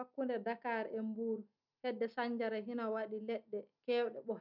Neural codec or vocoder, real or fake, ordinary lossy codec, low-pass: none; real; none; 5.4 kHz